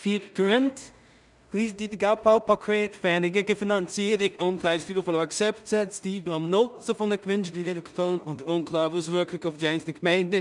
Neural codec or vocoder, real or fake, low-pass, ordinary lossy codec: codec, 16 kHz in and 24 kHz out, 0.4 kbps, LongCat-Audio-Codec, two codebook decoder; fake; 10.8 kHz; none